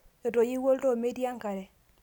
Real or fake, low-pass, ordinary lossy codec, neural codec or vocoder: real; 19.8 kHz; none; none